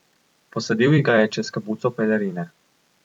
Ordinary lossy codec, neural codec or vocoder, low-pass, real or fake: none; vocoder, 44.1 kHz, 128 mel bands every 256 samples, BigVGAN v2; 19.8 kHz; fake